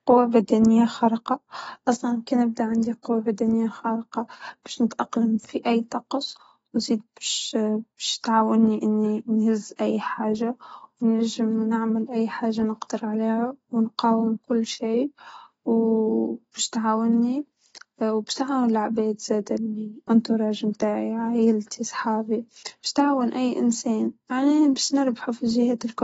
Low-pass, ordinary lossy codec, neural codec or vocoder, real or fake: 19.8 kHz; AAC, 24 kbps; none; real